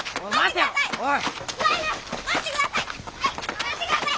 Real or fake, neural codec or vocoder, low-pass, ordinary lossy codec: real; none; none; none